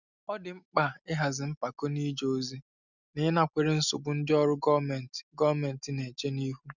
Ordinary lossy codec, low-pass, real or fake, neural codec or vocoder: none; 7.2 kHz; real; none